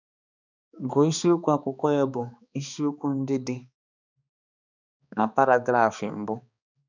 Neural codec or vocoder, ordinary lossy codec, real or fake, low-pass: codec, 16 kHz, 2 kbps, X-Codec, HuBERT features, trained on balanced general audio; none; fake; 7.2 kHz